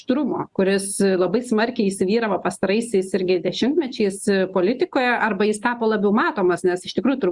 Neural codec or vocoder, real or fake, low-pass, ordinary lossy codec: none; real; 10.8 kHz; Opus, 64 kbps